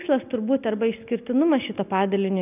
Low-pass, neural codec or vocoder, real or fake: 3.6 kHz; none; real